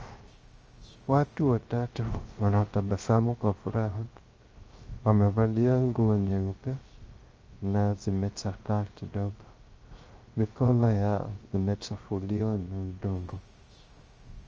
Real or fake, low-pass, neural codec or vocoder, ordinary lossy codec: fake; 7.2 kHz; codec, 16 kHz, 0.3 kbps, FocalCodec; Opus, 24 kbps